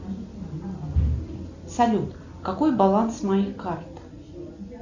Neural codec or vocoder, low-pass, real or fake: none; 7.2 kHz; real